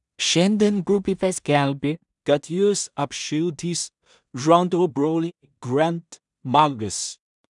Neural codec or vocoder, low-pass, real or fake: codec, 16 kHz in and 24 kHz out, 0.4 kbps, LongCat-Audio-Codec, two codebook decoder; 10.8 kHz; fake